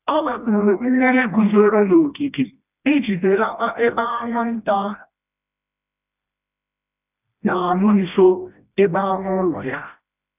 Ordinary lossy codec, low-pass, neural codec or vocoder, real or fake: none; 3.6 kHz; codec, 16 kHz, 1 kbps, FreqCodec, smaller model; fake